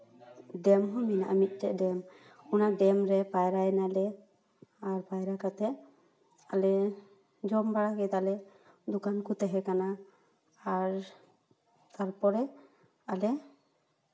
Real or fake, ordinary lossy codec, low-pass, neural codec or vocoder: real; none; none; none